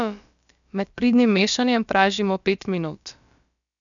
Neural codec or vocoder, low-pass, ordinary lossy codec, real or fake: codec, 16 kHz, about 1 kbps, DyCAST, with the encoder's durations; 7.2 kHz; none; fake